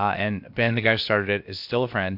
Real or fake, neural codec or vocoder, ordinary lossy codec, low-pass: fake; codec, 16 kHz, about 1 kbps, DyCAST, with the encoder's durations; MP3, 48 kbps; 5.4 kHz